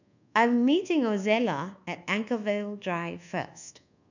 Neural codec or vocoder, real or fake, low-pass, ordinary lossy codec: codec, 24 kHz, 1.2 kbps, DualCodec; fake; 7.2 kHz; none